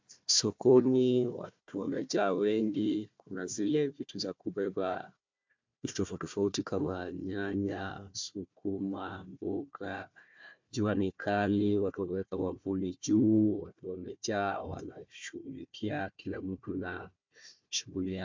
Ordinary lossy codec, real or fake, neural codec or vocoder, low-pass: MP3, 64 kbps; fake; codec, 16 kHz, 1 kbps, FunCodec, trained on Chinese and English, 50 frames a second; 7.2 kHz